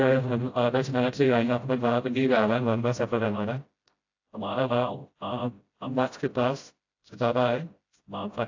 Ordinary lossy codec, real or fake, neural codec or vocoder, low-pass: AAC, 48 kbps; fake; codec, 16 kHz, 0.5 kbps, FreqCodec, smaller model; 7.2 kHz